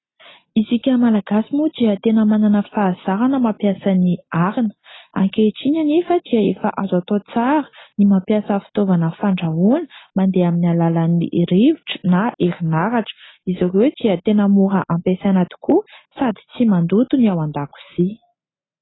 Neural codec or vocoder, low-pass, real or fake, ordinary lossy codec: none; 7.2 kHz; real; AAC, 16 kbps